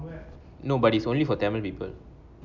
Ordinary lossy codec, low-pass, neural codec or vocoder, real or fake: none; 7.2 kHz; none; real